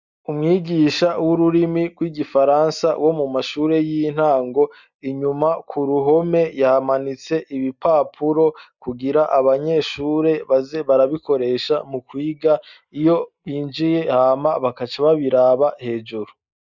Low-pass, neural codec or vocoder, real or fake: 7.2 kHz; none; real